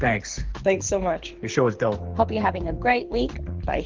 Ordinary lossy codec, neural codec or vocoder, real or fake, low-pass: Opus, 16 kbps; codec, 24 kHz, 6 kbps, HILCodec; fake; 7.2 kHz